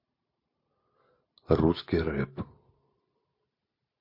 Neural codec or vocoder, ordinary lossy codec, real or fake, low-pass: none; MP3, 32 kbps; real; 5.4 kHz